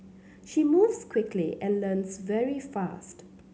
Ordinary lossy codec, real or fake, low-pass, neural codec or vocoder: none; real; none; none